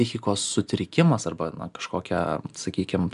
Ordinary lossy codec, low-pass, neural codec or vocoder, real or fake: MP3, 96 kbps; 10.8 kHz; none; real